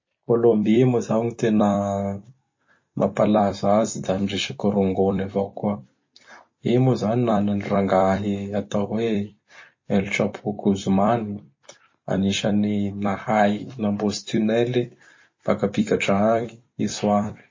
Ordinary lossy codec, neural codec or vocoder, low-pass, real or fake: MP3, 32 kbps; vocoder, 44.1 kHz, 128 mel bands every 256 samples, BigVGAN v2; 7.2 kHz; fake